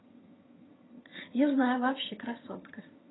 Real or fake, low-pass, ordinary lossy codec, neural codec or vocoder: fake; 7.2 kHz; AAC, 16 kbps; vocoder, 22.05 kHz, 80 mel bands, HiFi-GAN